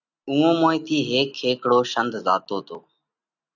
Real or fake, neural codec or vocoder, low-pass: real; none; 7.2 kHz